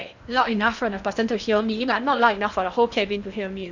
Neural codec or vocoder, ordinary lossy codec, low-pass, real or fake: codec, 16 kHz in and 24 kHz out, 0.8 kbps, FocalCodec, streaming, 65536 codes; none; 7.2 kHz; fake